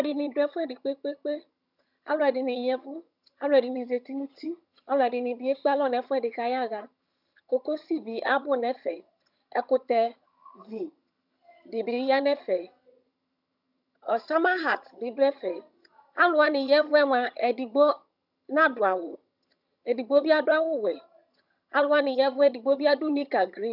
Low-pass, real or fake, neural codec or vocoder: 5.4 kHz; fake; vocoder, 22.05 kHz, 80 mel bands, HiFi-GAN